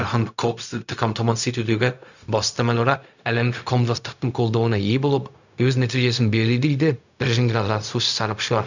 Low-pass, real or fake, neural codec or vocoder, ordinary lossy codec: 7.2 kHz; fake; codec, 16 kHz, 0.4 kbps, LongCat-Audio-Codec; none